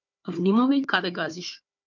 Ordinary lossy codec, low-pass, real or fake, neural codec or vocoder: MP3, 64 kbps; 7.2 kHz; fake; codec, 16 kHz, 4 kbps, FunCodec, trained on Chinese and English, 50 frames a second